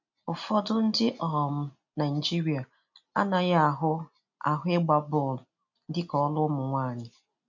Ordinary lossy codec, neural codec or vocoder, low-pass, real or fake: none; none; 7.2 kHz; real